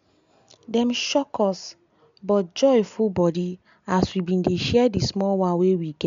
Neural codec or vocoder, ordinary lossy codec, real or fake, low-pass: none; MP3, 64 kbps; real; 7.2 kHz